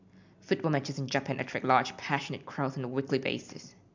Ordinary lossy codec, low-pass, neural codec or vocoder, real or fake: MP3, 64 kbps; 7.2 kHz; none; real